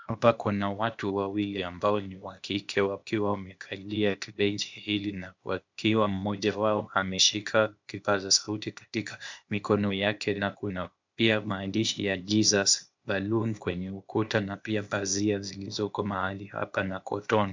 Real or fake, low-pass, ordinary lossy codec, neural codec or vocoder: fake; 7.2 kHz; MP3, 64 kbps; codec, 16 kHz, 0.8 kbps, ZipCodec